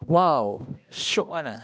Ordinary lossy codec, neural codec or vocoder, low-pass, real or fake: none; codec, 16 kHz, 1 kbps, X-Codec, HuBERT features, trained on balanced general audio; none; fake